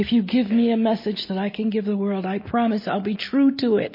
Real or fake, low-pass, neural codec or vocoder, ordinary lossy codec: real; 5.4 kHz; none; MP3, 24 kbps